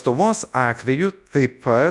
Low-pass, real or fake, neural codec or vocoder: 10.8 kHz; fake; codec, 24 kHz, 0.9 kbps, WavTokenizer, large speech release